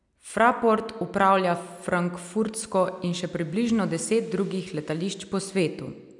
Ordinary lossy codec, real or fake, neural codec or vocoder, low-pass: none; real; none; 10.8 kHz